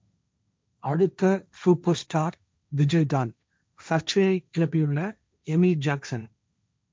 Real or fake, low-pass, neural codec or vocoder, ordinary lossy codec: fake; none; codec, 16 kHz, 1.1 kbps, Voila-Tokenizer; none